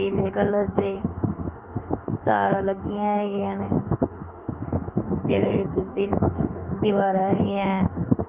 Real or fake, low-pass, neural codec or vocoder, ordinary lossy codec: fake; 3.6 kHz; autoencoder, 48 kHz, 32 numbers a frame, DAC-VAE, trained on Japanese speech; none